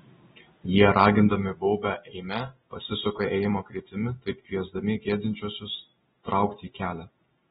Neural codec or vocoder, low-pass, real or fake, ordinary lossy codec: none; 19.8 kHz; real; AAC, 16 kbps